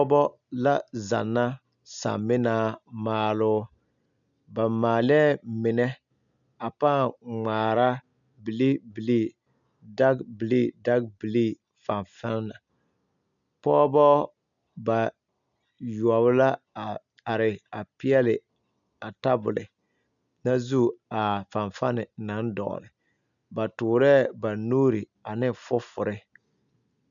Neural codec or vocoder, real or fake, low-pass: none; real; 7.2 kHz